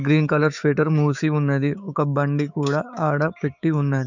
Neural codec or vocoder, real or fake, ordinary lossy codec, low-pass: codec, 16 kHz, 6 kbps, DAC; fake; none; 7.2 kHz